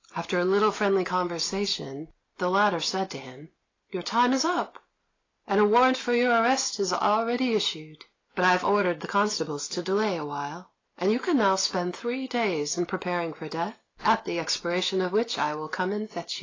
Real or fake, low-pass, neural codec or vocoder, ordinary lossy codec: real; 7.2 kHz; none; AAC, 32 kbps